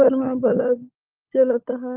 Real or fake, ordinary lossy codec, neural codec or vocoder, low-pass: fake; Opus, 24 kbps; codec, 16 kHz, 16 kbps, FunCodec, trained on LibriTTS, 50 frames a second; 3.6 kHz